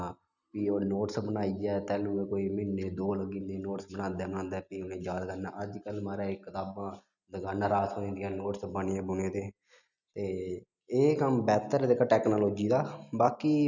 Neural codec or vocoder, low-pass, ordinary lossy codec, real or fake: none; 7.2 kHz; none; real